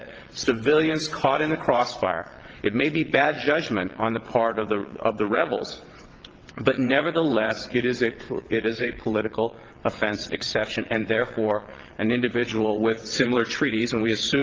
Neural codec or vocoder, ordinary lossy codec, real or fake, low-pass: vocoder, 22.05 kHz, 80 mel bands, WaveNeXt; Opus, 16 kbps; fake; 7.2 kHz